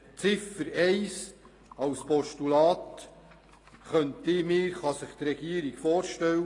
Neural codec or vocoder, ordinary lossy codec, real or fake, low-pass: none; AAC, 32 kbps; real; 10.8 kHz